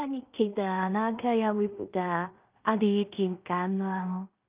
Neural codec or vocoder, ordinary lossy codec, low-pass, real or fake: codec, 16 kHz in and 24 kHz out, 0.4 kbps, LongCat-Audio-Codec, two codebook decoder; Opus, 24 kbps; 3.6 kHz; fake